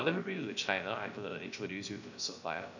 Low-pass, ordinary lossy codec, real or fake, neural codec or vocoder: 7.2 kHz; none; fake; codec, 16 kHz, 0.3 kbps, FocalCodec